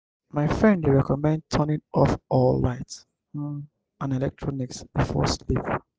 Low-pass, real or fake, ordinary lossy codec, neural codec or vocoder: none; real; none; none